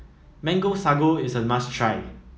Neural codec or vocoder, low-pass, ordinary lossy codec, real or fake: none; none; none; real